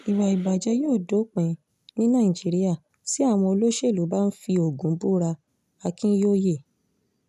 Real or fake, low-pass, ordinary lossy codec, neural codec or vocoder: real; 14.4 kHz; none; none